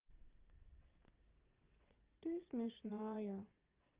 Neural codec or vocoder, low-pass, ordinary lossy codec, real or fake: vocoder, 22.05 kHz, 80 mel bands, WaveNeXt; 3.6 kHz; Opus, 32 kbps; fake